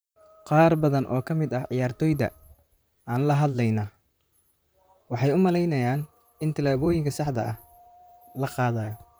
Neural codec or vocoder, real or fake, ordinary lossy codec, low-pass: vocoder, 44.1 kHz, 128 mel bands, Pupu-Vocoder; fake; none; none